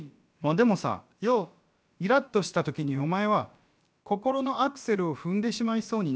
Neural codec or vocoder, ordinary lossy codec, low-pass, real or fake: codec, 16 kHz, about 1 kbps, DyCAST, with the encoder's durations; none; none; fake